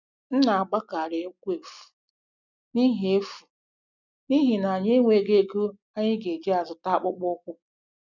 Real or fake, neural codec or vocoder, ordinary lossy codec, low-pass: real; none; none; 7.2 kHz